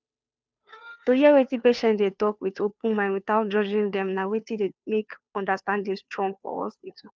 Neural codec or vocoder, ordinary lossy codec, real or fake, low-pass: codec, 16 kHz, 2 kbps, FunCodec, trained on Chinese and English, 25 frames a second; none; fake; none